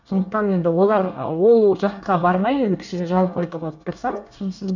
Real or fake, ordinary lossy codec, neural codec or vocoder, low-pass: fake; none; codec, 24 kHz, 1 kbps, SNAC; 7.2 kHz